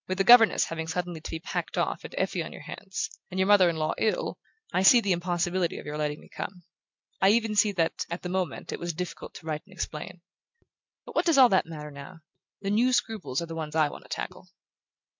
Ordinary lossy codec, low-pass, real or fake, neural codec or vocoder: MP3, 64 kbps; 7.2 kHz; real; none